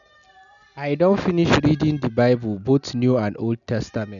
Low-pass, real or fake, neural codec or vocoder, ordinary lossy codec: 7.2 kHz; real; none; none